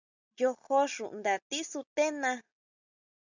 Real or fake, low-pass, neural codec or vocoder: real; 7.2 kHz; none